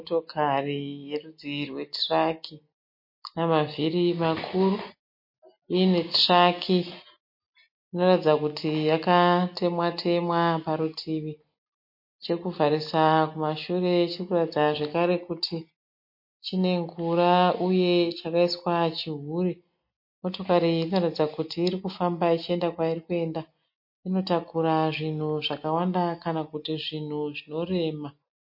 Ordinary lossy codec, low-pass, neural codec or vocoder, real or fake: MP3, 32 kbps; 5.4 kHz; none; real